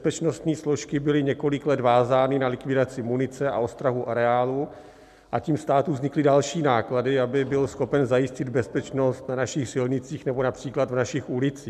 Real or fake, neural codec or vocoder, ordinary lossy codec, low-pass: real; none; MP3, 96 kbps; 14.4 kHz